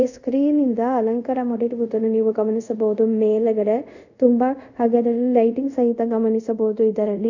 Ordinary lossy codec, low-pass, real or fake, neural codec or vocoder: none; 7.2 kHz; fake; codec, 24 kHz, 0.5 kbps, DualCodec